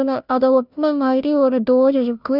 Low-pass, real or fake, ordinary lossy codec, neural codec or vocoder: 5.4 kHz; fake; none; codec, 16 kHz, 0.5 kbps, FunCodec, trained on Chinese and English, 25 frames a second